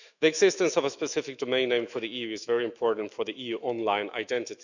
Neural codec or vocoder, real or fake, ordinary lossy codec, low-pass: autoencoder, 48 kHz, 128 numbers a frame, DAC-VAE, trained on Japanese speech; fake; none; 7.2 kHz